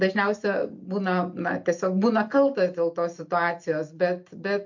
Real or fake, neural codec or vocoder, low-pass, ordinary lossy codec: real; none; 7.2 kHz; MP3, 48 kbps